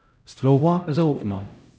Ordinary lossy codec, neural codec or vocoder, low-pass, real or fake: none; codec, 16 kHz, 0.5 kbps, X-Codec, HuBERT features, trained on LibriSpeech; none; fake